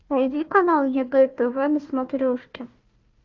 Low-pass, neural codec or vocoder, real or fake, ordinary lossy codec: 7.2 kHz; codec, 16 kHz, 1 kbps, FunCodec, trained on Chinese and English, 50 frames a second; fake; Opus, 24 kbps